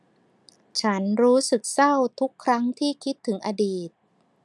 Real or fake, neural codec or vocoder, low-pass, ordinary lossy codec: real; none; none; none